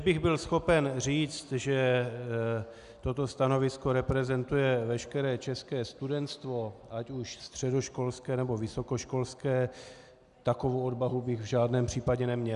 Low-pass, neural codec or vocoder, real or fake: 10.8 kHz; none; real